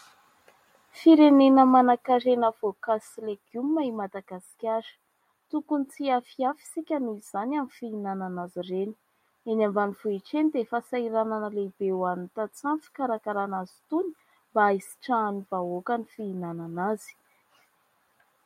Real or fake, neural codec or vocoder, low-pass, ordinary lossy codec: real; none; 19.8 kHz; MP3, 64 kbps